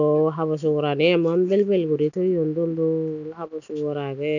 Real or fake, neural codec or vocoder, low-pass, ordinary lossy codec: real; none; 7.2 kHz; none